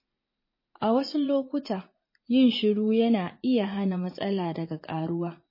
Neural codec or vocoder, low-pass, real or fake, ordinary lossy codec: vocoder, 44.1 kHz, 80 mel bands, Vocos; 5.4 kHz; fake; MP3, 24 kbps